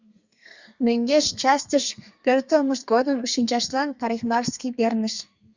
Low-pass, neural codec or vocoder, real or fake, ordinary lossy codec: 7.2 kHz; codec, 32 kHz, 1.9 kbps, SNAC; fake; Opus, 64 kbps